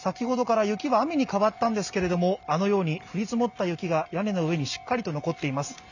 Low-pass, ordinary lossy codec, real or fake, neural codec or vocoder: 7.2 kHz; none; real; none